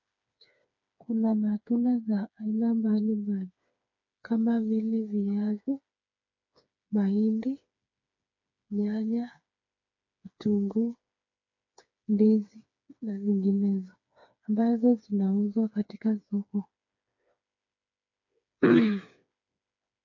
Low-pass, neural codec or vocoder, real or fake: 7.2 kHz; codec, 16 kHz, 4 kbps, FreqCodec, smaller model; fake